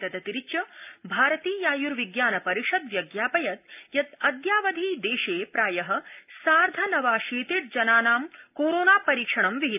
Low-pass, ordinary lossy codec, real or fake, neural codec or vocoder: 3.6 kHz; none; real; none